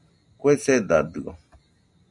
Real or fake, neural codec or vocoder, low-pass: real; none; 10.8 kHz